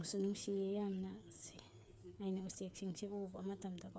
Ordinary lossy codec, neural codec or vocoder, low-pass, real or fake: none; codec, 16 kHz, 8 kbps, FreqCodec, smaller model; none; fake